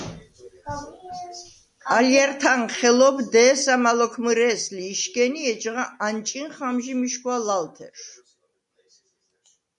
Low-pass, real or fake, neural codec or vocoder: 10.8 kHz; real; none